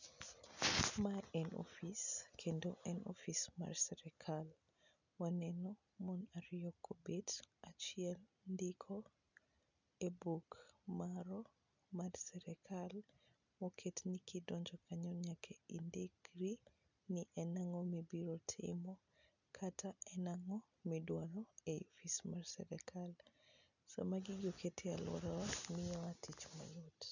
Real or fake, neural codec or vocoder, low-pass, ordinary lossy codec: real; none; 7.2 kHz; none